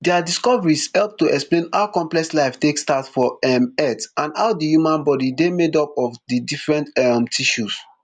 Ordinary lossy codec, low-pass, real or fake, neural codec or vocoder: none; 9.9 kHz; real; none